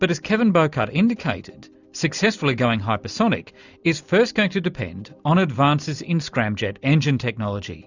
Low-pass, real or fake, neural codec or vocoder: 7.2 kHz; real; none